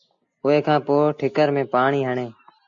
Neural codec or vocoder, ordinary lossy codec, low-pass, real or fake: none; AAC, 64 kbps; 7.2 kHz; real